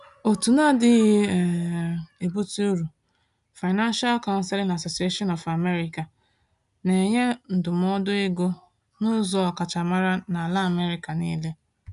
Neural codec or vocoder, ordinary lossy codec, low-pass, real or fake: none; none; 10.8 kHz; real